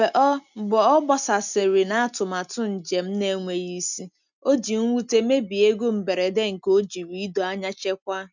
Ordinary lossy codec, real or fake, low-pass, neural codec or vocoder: none; real; 7.2 kHz; none